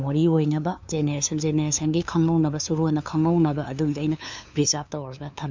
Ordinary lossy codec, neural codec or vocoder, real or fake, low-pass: MP3, 64 kbps; codec, 16 kHz, 2 kbps, FunCodec, trained on LibriTTS, 25 frames a second; fake; 7.2 kHz